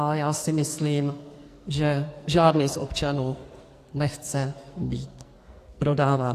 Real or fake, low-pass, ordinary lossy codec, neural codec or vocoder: fake; 14.4 kHz; AAC, 64 kbps; codec, 44.1 kHz, 2.6 kbps, SNAC